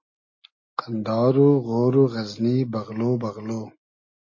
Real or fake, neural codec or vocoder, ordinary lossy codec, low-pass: real; none; MP3, 32 kbps; 7.2 kHz